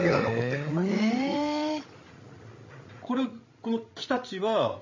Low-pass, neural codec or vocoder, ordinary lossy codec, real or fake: 7.2 kHz; codec, 16 kHz, 16 kbps, FreqCodec, larger model; MP3, 32 kbps; fake